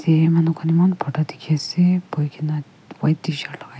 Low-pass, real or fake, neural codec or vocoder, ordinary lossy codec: none; real; none; none